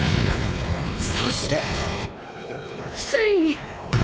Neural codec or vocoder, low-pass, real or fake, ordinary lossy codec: codec, 16 kHz, 2 kbps, X-Codec, WavLM features, trained on Multilingual LibriSpeech; none; fake; none